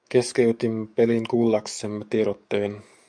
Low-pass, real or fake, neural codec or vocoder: 9.9 kHz; fake; codec, 44.1 kHz, 7.8 kbps, DAC